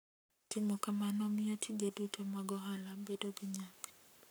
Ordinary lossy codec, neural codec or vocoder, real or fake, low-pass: none; codec, 44.1 kHz, 7.8 kbps, Pupu-Codec; fake; none